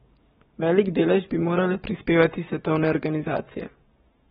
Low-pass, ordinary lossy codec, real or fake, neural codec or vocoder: 19.8 kHz; AAC, 16 kbps; fake; codec, 44.1 kHz, 7.8 kbps, Pupu-Codec